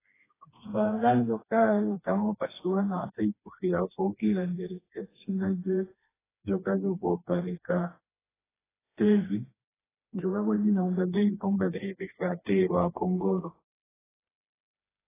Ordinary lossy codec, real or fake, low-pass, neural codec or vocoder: AAC, 16 kbps; fake; 3.6 kHz; codec, 16 kHz, 2 kbps, FreqCodec, smaller model